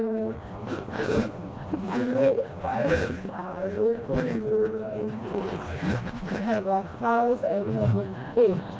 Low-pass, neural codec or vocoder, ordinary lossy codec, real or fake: none; codec, 16 kHz, 1 kbps, FreqCodec, smaller model; none; fake